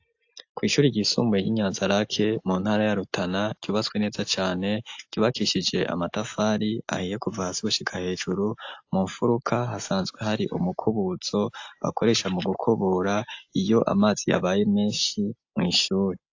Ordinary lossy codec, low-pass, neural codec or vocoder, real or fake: AAC, 48 kbps; 7.2 kHz; none; real